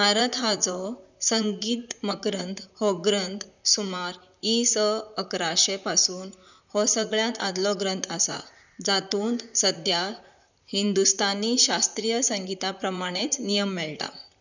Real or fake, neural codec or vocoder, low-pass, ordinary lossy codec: fake; vocoder, 44.1 kHz, 128 mel bands, Pupu-Vocoder; 7.2 kHz; none